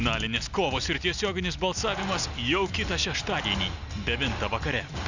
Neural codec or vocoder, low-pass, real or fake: none; 7.2 kHz; real